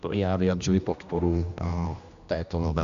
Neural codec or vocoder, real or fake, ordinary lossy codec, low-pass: codec, 16 kHz, 1 kbps, X-Codec, HuBERT features, trained on general audio; fake; Opus, 64 kbps; 7.2 kHz